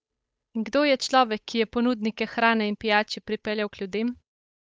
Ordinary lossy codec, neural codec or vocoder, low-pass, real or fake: none; codec, 16 kHz, 8 kbps, FunCodec, trained on Chinese and English, 25 frames a second; none; fake